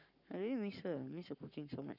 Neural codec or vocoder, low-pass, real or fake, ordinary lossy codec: codec, 44.1 kHz, 7.8 kbps, Pupu-Codec; 5.4 kHz; fake; none